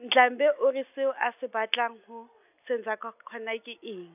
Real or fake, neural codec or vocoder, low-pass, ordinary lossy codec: real; none; 3.6 kHz; none